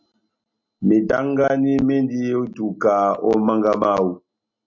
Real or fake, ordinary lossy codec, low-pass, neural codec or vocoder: real; MP3, 64 kbps; 7.2 kHz; none